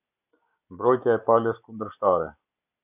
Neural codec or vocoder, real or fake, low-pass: none; real; 3.6 kHz